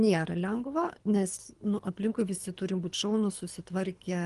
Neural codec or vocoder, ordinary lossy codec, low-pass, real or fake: codec, 24 kHz, 3 kbps, HILCodec; Opus, 24 kbps; 10.8 kHz; fake